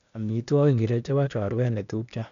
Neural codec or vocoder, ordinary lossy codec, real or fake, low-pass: codec, 16 kHz, 0.8 kbps, ZipCodec; none; fake; 7.2 kHz